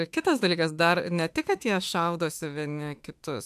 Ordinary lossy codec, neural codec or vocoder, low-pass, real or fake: AAC, 96 kbps; autoencoder, 48 kHz, 32 numbers a frame, DAC-VAE, trained on Japanese speech; 14.4 kHz; fake